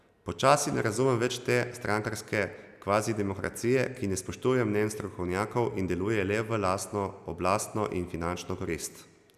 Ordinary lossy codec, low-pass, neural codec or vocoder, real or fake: none; 14.4 kHz; none; real